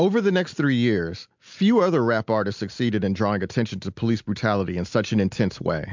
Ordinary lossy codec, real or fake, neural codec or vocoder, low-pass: MP3, 64 kbps; real; none; 7.2 kHz